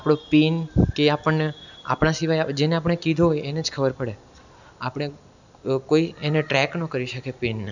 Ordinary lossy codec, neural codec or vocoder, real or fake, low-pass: none; none; real; 7.2 kHz